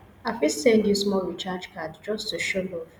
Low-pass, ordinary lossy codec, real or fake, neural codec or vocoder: 19.8 kHz; none; real; none